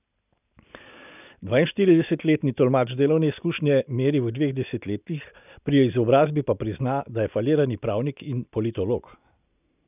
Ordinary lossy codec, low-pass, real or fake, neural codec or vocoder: none; 3.6 kHz; real; none